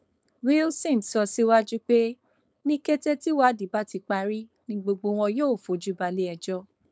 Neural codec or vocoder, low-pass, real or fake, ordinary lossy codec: codec, 16 kHz, 4.8 kbps, FACodec; none; fake; none